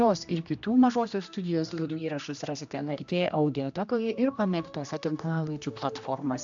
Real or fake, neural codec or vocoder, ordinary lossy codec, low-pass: fake; codec, 16 kHz, 1 kbps, X-Codec, HuBERT features, trained on general audio; AAC, 64 kbps; 7.2 kHz